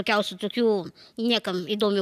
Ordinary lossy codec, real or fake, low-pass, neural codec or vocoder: AAC, 96 kbps; real; 14.4 kHz; none